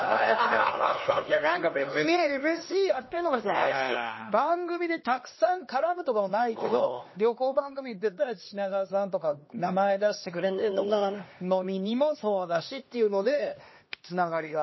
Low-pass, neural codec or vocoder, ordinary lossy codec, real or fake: 7.2 kHz; codec, 16 kHz, 2 kbps, X-Codec, HuBERT features, trained on LibriSpeech; MP3, 24 kbps; fake